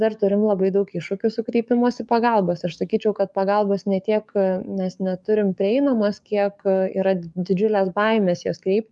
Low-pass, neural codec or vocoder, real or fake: 10.8 kHz; codec, 44.1 kHz, 7.8 kbps, DAC; fake